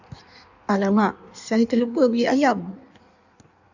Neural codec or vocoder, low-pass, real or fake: codec, 16 kHz in and 24 kHz out, 1.1 kbps, FireRedTTS-2 codec; 7.2 kHz; fake